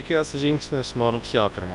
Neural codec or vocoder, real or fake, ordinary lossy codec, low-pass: codec, 24 kHz, 0.9 kbps, WavTokenizer, large speech release; fake; Opus, 64 kbps; 10.8 kHz